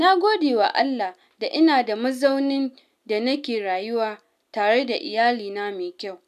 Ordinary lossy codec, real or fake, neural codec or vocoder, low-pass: none; real; none; 14.4 kHz